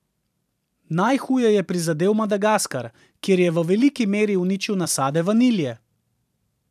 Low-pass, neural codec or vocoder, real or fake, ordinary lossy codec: 14.4 kHz; vocoder, 44.1 kHz, 128 mel bands every 512 samples, BigVGAN v2; fake; none